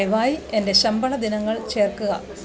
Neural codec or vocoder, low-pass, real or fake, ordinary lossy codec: none; none; real; none